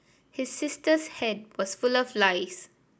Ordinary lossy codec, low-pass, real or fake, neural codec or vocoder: none; none; real; none